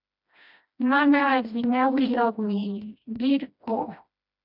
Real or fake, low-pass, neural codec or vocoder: fake; 5.4 kHz; codec, 16 kHz, 1 kbps, FreqCodec, smaller model